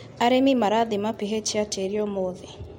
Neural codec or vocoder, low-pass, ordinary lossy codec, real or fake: none; 19.8 kHz; MP3, 64 kbps; real